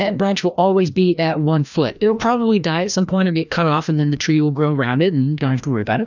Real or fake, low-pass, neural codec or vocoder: fake; 7.2 kHz; codec, 16 kHz, 1 kbps, FreqCodec, larger model